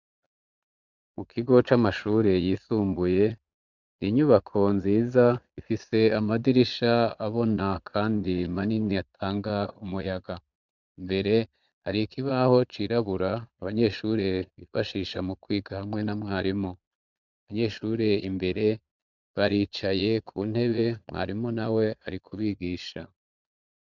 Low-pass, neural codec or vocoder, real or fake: 7.2 kHz; vocoder, 22.05 kHz, 80 mel bands, Vocos; fake